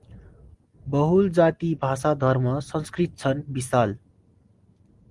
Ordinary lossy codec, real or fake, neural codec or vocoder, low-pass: Opus, 24 kbps; real; none; 10.8 kHz